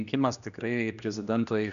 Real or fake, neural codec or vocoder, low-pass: fake; codec, 16 kHz, 1 kbps, X-Codec, HuBERT features, trained on general audio; 7.2 kHz